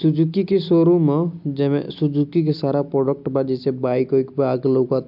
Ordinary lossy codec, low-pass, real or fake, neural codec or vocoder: MP3, 48 kbps; 5.4 kHz; real; none